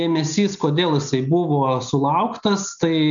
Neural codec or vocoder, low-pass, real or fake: none; 7.2 kHz; real